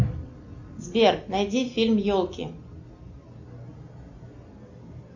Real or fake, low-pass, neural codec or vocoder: real; 7.2 kHz; none